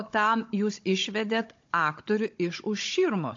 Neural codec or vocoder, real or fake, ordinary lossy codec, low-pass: codec, 16 kHz, 16 kbps, FunCodec, trained on Chinese and English, 50 frames a second; fake; AAC, 48 kbps; 7.2 kHz